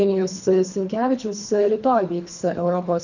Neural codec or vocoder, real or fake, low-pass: codec, 24 kHz, 3 kbps, HILCodec; fake; 7.2 kHz